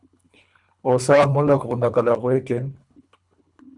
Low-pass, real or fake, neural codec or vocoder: 10.8 kHz; fake; codec, 24 kHz, 3 kbps, HILCodec